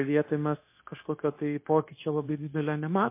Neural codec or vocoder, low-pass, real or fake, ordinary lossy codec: codec, 16 kHz, 0.9 kbps, LongCat-Audio-Codec; 3.6 kHz; fake; MP3, 24 kbps